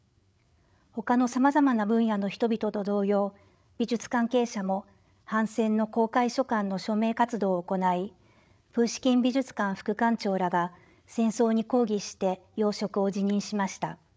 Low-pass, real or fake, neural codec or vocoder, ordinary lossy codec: none; fake; codec, 16 kHz, 8 kbps, FreqCodec, larger model; none